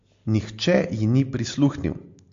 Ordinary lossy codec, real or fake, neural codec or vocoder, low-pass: MP3, 48 kbps; real; none; 7.2 kHz